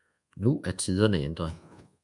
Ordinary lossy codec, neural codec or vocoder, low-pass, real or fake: MP3, 96 kbps; codec, 24 kHz, 1.2 kbps, DualCodec; 10.8 kHz; fake